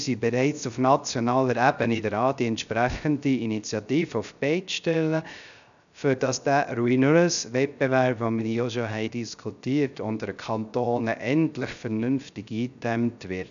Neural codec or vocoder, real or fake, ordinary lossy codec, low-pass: codec, 16 kHz, 0.3 kbps, FocalCodec; fake; none; 7.2 kHz